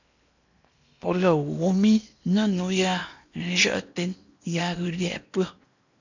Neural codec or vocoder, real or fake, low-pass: codec, 16 kHz in and 24 kHz out, 0.8 kbps, FocalCodec, streaming, 65536 codes; fake; 7.2 kHz